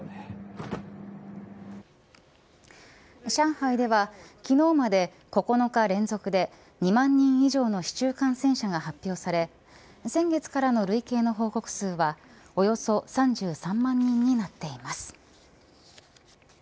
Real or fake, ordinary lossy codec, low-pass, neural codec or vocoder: real; none; none; none